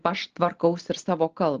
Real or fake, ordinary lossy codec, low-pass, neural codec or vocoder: real; Opus, 16 kbps; 7.2 kHz; none